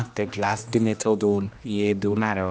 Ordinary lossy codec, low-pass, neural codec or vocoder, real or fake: none; none; codec, 16 kHz, 1 kbps, X-Codec, HuBERT features, trained on general audio; fake